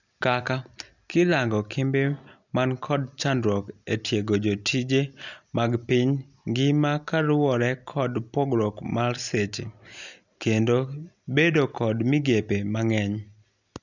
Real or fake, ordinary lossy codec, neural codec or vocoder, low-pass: real; none; none; 7.2 kHz